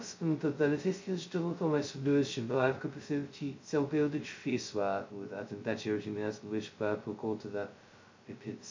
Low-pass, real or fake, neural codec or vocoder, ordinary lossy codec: 7.2 kHz; fake; codec, 16 kHz, 0.2 kbps, FocalCodec; MP3, 48 kbps